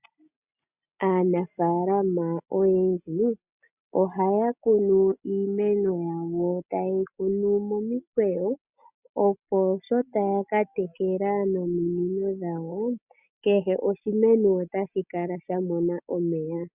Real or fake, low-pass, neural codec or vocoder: real; 3.6 kHz; none